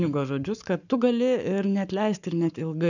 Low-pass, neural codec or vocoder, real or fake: 7.2 kHz; codec, 44.1 kHz, 7.8 kbps, Pupu-Codec; fake